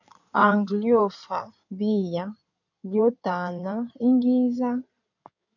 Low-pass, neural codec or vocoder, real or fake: 7.2 kHz; codec, 16 kHz in and 24 kHz out, 2.2 kbps, FireRedTTS-2 codec; fake